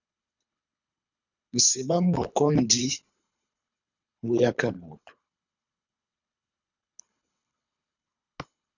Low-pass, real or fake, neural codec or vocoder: 7.2 kHz; fake; codec, 24 kHz, 3 kbps, HILCodec